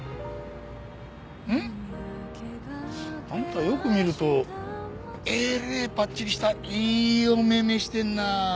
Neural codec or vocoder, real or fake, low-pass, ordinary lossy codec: none; real; none; none